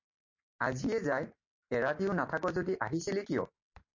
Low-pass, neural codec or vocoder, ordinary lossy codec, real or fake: 7.2 kHz; none; MP3, 48 kbps; real